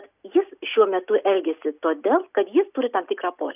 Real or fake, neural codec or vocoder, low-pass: real; none; 3.6 kHz